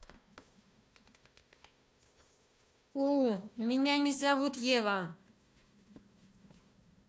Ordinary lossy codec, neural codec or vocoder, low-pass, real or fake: none; codec, 16 kHz, 1 kbps, FunCodec, trained on Chinese and English, 50 frames a second; none; fake